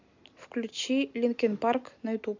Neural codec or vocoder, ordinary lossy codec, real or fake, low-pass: none; MP3, 48 kbps; real; 7.2 kHz